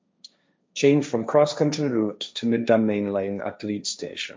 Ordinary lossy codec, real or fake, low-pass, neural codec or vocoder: none; fake; none; codec, 16 kHz, 1.1 kbps, Voila-Tokenizer